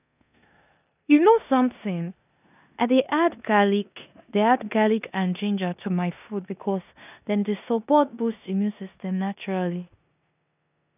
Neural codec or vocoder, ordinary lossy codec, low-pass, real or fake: codec, 16 kHz in and 24 kHz out, 0.9 kbps, LongCat-Audio-Codec, four codebook decoder; none; 3.6 kHz; fake